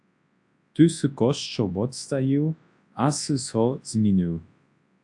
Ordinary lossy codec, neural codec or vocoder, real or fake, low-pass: AAC, 64 kbps; codec, 24 kHz, 0.9 kbps, WavTokenizer, large speech release; fake; 10.8 kHz